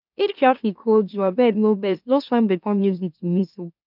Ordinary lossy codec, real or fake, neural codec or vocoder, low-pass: none; fake; autoencoder, 44.1 kHz, a latent of 192 numbers a frame, MeloTTS; 5.4 kHz